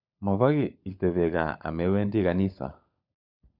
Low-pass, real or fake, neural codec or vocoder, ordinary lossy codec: 5.4 kHz; fake; codec, 16 kHz, 16 kbps, FunCodec, trained on LibriTTS, 50 frames a second; none